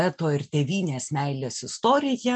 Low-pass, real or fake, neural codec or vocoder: 9.9 kHz; real; none